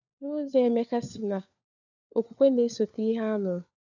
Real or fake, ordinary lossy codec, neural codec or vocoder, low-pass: fake; none; codec, 16 kHz, 4 kbps, FunCodec, trained on LibriTTS, 50 frames a second; 7.2 kHz